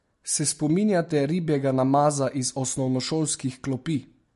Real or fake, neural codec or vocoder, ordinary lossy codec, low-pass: real; none; MP3, 48 kbps; 14.4 kHz